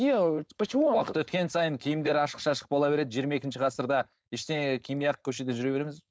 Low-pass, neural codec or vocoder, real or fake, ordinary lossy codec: none; codec, 16 kHz, 4.8 kbps, FACodec; fake; none